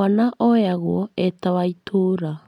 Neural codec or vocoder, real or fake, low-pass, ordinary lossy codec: none; real; 19.8 kHz; none